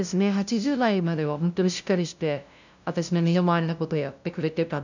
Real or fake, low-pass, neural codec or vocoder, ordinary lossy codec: fake; 7.2 kHz; codec, 16 kHz, 0.5 kbps, FunCodec, trained on LibriTTS, 25 frames a second; none